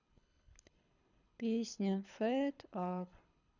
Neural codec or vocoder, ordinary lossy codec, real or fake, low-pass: codec, 24 kHz, 6 kbps, HILCodec; none; fake; 7.2 kHz